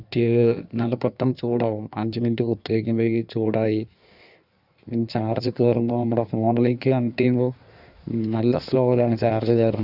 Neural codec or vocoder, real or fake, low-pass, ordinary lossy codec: codec, 16 kHz in and 24 kHz out, 1.1 kbps, FireRedTTS-2 codec; fake; 5.4 kHz; none